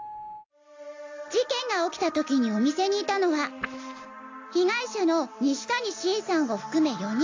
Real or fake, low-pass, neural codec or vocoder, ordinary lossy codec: real; 7.2 kHz; none; AAC, 48 kbps